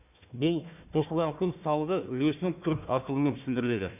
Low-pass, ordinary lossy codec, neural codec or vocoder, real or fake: 3.6 kHz; none; codec, 16 kHz, 1 kbps, FunCodec, trained on Chinese and English, 50 frames a second; fake